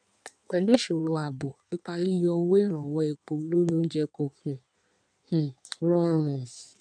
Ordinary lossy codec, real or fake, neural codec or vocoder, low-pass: none; fake; codec, 16 kHz in and 24 kHz out, 1.1 kbps, FireRedTTS-2 codec; 9.9 kHz